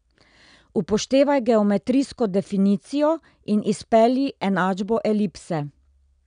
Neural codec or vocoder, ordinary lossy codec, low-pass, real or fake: none; none; 9.9 kHz; real